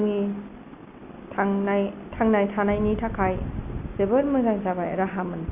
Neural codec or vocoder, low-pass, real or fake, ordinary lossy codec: none; 3.6 kHz; real; Opus, 64 kbps